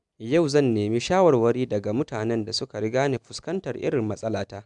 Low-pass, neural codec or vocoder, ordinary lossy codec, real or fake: 10.8 kHz; none; none; real